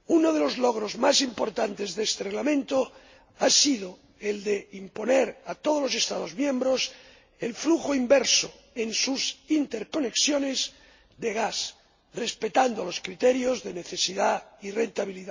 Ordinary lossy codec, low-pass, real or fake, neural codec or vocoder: MP3, 32 kbps; 7.2 kHz; real; none